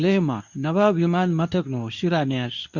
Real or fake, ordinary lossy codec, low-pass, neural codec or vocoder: fake; none; 7.2 kHz; codec, 24 kHz, 0.9 kbps, WavTokenizer, medium speech release version 2